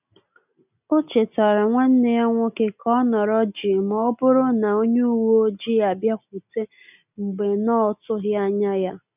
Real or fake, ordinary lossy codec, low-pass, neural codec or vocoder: real; none; 3.6 kHz; none